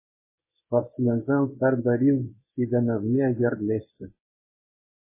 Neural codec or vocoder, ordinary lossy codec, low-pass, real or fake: codec, 24 kHz, 0.9 kbps, WavTokenizer, medium speech release version 1; MP3, 16 kbps; 3.6 kHz; fake